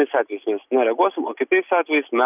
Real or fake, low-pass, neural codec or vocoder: real; 3.6 kHz; none